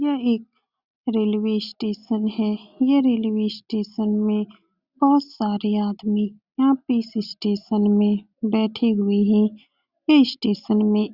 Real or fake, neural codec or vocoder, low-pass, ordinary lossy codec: real; none; 5.4 kHz; Opus, 64 kbps